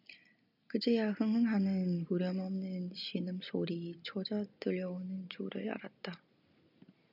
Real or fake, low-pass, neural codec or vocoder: real; 5.4 kHz; none